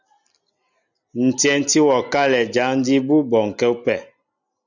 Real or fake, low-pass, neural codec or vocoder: real; 7.2 kHz; none